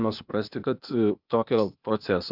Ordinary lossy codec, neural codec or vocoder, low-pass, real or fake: Opus, 64 kbps; codec, 16 kHz, 0.8 kbps, ZipCodec; 5.4 kHz; fake